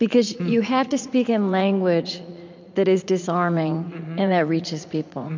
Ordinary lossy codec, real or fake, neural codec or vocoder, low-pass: MP3, 64 kbps; fake; vocoder, 44.1 kHz, 80 mel bands, Vocos; 7.2 kHz